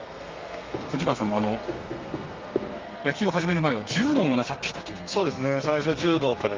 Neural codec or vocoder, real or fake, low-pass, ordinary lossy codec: codec, 32 kHz, 1.9 kbps, SNAC; fake; 7.2 kHz; Opus, 24 kbps